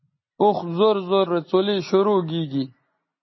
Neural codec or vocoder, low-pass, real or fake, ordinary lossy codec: none; 7.2 kHz; real; MP3, 24 kbps